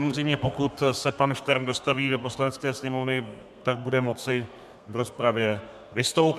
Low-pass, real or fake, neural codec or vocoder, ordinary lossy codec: 14.4 kHz; fake; codec, 32 kHz, 1.9 kbps, SNAC; MP3, 96 kbps